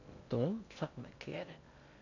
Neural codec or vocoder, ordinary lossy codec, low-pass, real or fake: codec, 16 kHz in and 24 kHz out, 0.6 kbps, FocalCodec, streaming, 2048 codes; MP3, 64 kbps; 7.2 kHz; fake